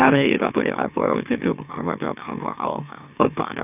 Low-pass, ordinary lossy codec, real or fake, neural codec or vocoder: 3.6 kHz; none; fake; autoencoder, 44.1 kHz, a latent of 192 numbers a frame, MeloTTS